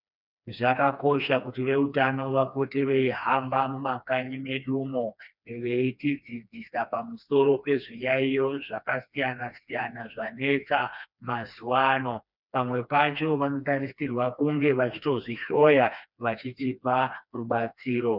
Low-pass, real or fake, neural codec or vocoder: 5.4 kHz; fake; codec, 16 kHz, 2 kbps, FreqCodec, smaller model